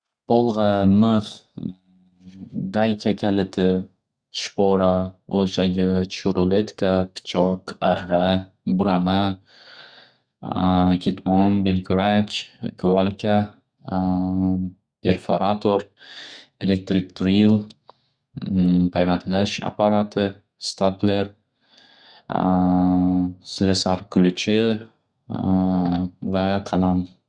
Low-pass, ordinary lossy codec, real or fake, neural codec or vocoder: 9.9 kHz; Opus, 64 kbps; fake; codec, 32 kHz, 1.9 kbps, SNAC